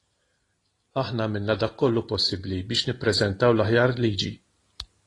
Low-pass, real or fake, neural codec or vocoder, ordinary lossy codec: 10.8 kHz; real; none; AAC, 32 kbps